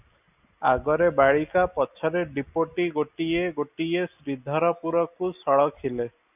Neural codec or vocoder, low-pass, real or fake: none; 3.6 kHz; real